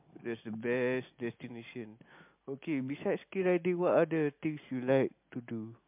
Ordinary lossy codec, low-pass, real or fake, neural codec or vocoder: MP3, 32 kbps; 3.6 kHz; real; none